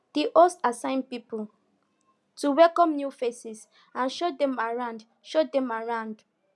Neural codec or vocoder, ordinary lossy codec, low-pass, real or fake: none; none; none; real